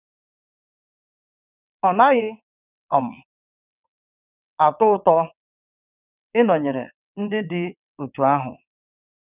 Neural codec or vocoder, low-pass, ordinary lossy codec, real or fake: vocoder, 22.05 kHz, 80 mel bands, WaveNeXt; 3.6 kHz; none; fake